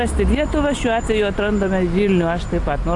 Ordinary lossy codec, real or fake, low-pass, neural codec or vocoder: AAC, 48 kbps; real; 10.8 kHz; none